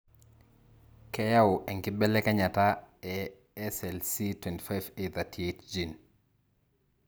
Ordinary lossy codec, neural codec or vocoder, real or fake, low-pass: none; none; real; none